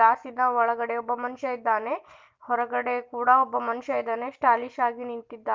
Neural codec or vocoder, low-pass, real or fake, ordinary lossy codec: none; 7.2 kHz; real; Opus, 32 kbps